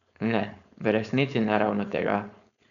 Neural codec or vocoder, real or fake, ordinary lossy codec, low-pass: codec, 16 kHz, 4.8 kbps, FACodec; fake; none; 7.2 kHz